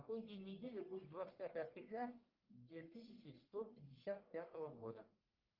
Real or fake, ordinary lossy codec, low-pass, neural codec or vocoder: fake; Opus, 32 kbps; 5.4 kHz; codec, 44.1 kHz, 1.7 kbps, Pupu-Codec